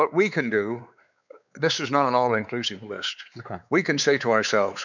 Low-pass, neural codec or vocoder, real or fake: 7.2 kHz; codec, 16 kHz, 4 kbps, X-Codec, WavLM features, trained on Multilingual LibriSpeech; fake